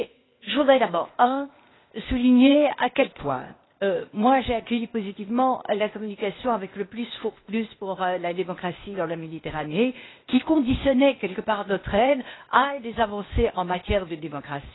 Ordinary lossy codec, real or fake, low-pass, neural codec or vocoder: AAC, 16 kbps; fake; 7.2 kHz; codec, 16 kHz, 0.8 kbps, ZipCodec